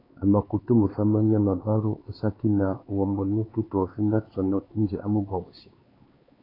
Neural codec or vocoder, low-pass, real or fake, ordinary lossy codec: codec, 16 kHz, 4 kbps, X-Codec, HuBERT features, trained on LibriSpeech; 5.4 kHz; fake; AAC, 24 kbps